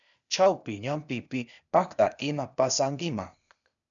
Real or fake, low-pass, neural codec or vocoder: fake; 7.2 kHz; codec, 16 kHz, 0.8 kbps, ZipCodec